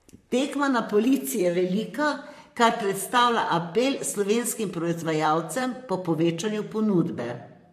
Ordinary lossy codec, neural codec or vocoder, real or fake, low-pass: MP3, 64 kbps; vocoder, 44.1 kHz, 128 mel bands, Pupu-Vocoder; fake; 14.4 kHz